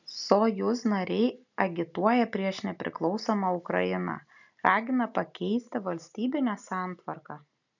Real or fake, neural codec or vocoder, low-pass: real; none; 7.2 kHz